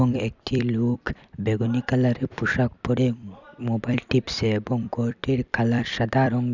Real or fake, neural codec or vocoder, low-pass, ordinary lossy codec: fake; vocoder, 44.1 kHz, 128 mel bands every 256 samples, BigVGAN v2; 7.2 kHz; none